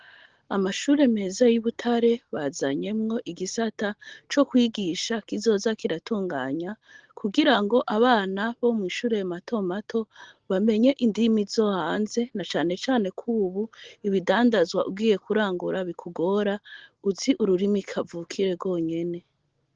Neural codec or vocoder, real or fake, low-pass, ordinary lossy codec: none; real; 7.2 kHz; Opus, 16 kbps